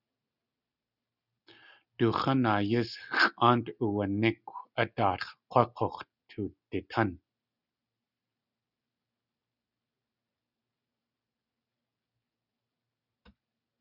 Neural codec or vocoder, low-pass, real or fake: none; 5.4 kHz; real